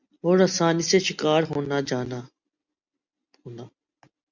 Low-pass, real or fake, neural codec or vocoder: 7.2 kHz; real; none